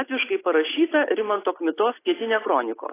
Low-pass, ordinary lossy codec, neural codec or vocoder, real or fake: 3.6 kHz; AAC, 16 kbps; none; real